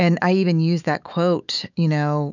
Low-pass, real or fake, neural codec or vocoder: 7.2 kHz; fake; autoencoder, 48 kHz, 128 numbers a frame, DAC-VAE, trained on Japanese speech